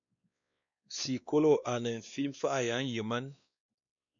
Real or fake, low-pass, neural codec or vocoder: fake; 7.2 kHz; codec, 16 kHz, 2 kbps, X-Codec, WavLM features, trained on Multilingual LibriSpeech